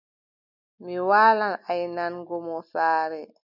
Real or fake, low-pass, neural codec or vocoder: real; 5.4 kHz; none